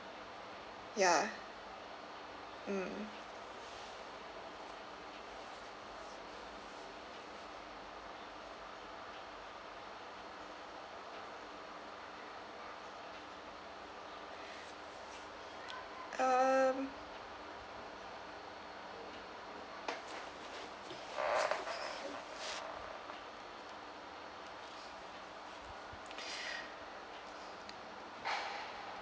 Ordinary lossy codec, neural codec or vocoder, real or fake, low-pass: none; none; real; none